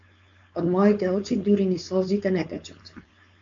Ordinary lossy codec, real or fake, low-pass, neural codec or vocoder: MP3, 48 kbps; fake; 7.2 kHz; codec, 16 kHz, 4.8 kbps, FACodec